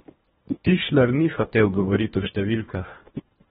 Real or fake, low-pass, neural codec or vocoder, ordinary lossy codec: fake; 10.8 kHz; codec, 24 kHz, 1.5 kbps, HILCodec; AAC, 16 kbps